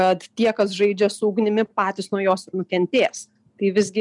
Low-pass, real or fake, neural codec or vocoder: 10.8 kHz; real; none